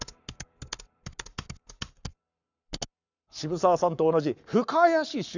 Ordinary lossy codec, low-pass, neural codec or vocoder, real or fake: none; 7.2 kHz; codec, 44.1 kHz, 7.8 kbps, Pupu-Codec; fake